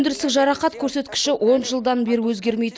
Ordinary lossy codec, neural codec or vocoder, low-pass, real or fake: none; none; none; real